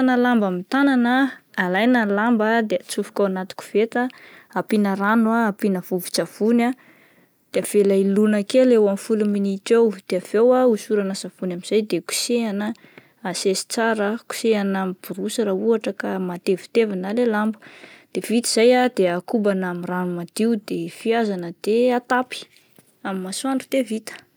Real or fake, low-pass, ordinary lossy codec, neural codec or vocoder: real; none; none; none